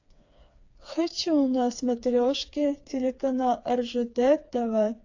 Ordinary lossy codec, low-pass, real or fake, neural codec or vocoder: AAC, 48 kbps; 7.2 kHz; fake; codec, 16 kHz, 4 kbps, FreqCodec, smaller model